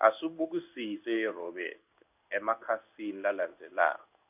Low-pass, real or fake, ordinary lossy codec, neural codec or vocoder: 3.6 kHz; fake; none; codec, 16 kHz in and 24 kHz out, 1 kbps, XY-Tokenizer